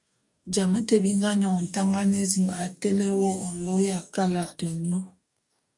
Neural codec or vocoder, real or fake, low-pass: codec, 44.1 kHz, 2.6 kbps, DAC; fake; 10.8 kHz